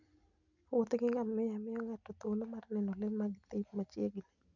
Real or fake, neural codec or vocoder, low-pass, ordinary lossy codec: real; none; 7.2 kHz; none